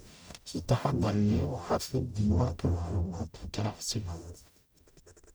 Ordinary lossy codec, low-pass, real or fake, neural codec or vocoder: none; none; fake; codec, 44.1 kHz, 0.9 kbps, DAC